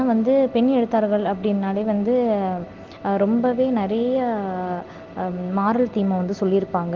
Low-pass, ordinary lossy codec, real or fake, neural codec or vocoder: 7.2 kHz; Opus, 16 kbps; real; none